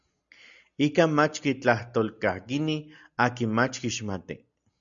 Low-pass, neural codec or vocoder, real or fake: 7.2 kHz; none; real